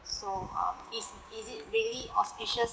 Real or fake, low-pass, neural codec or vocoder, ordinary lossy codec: fake; none; codec, 16 kHz, 6 kbps, DAC; none